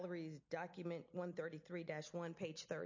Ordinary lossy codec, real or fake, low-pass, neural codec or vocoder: MP3, 48 kbps; real; 7.2 kHz; none